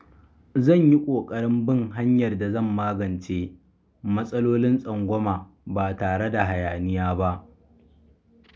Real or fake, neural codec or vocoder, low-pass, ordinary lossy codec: real; none; none; none